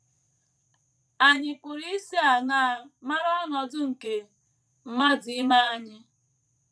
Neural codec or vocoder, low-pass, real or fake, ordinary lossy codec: vocoder, 22.05 kHz, 80 mel bands, Vocos; none; fake; none